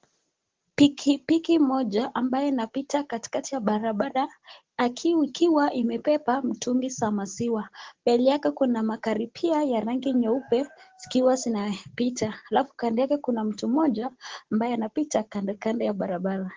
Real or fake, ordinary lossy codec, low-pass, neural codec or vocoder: real; Opus, 16 kbps; 7.2 kHz; none